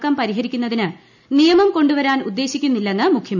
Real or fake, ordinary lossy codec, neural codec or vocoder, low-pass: real; none; none; 7.2 kHz